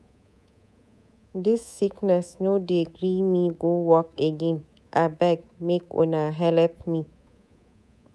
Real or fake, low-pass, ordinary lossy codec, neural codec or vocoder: fake; none; none; codec, 24 kHz, 3.1 kbps, DualCodec